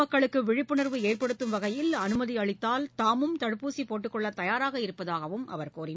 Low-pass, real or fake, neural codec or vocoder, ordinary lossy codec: none; real; none; none